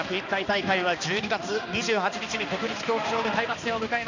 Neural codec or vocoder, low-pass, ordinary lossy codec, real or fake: codec, 16 kHz, 2 kbps, X-Codec, HuBERT features, trained on balanced general audio; 7.2 kHz; none; fake